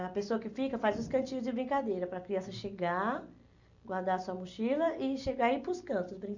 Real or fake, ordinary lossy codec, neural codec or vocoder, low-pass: real; none; none; 7.2 kHz